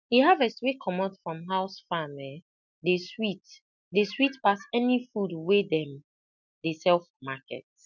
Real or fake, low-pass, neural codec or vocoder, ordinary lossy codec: real; 7.2 kHz; none; MP3, 64 kbps